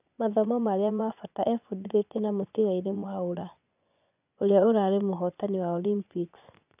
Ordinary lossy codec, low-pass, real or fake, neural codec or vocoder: none; 3.6 kHz; fake; vocoder, 24 kHz, 100 mel bands, Vocos